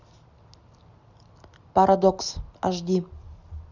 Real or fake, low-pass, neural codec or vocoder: real; 7.2 kHz; none